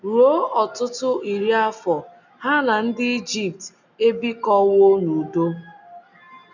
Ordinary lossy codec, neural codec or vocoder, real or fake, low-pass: none; none; real; 7.2 kHz